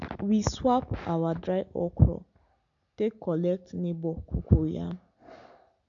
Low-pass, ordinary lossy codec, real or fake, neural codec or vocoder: 7.2 kHz; none; real; none